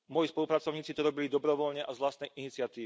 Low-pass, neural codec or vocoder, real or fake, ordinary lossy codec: none; none; real; none